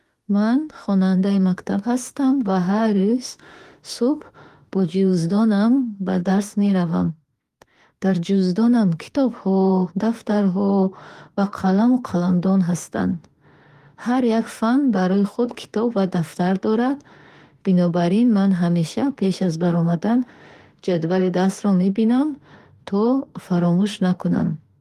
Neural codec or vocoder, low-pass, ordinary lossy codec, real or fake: autoencoder, 48 kHz, 32 numbers a frame, DAC-VAE, trained on Japanese speech; 14.4 kHz; Opus, 24 kbps; fake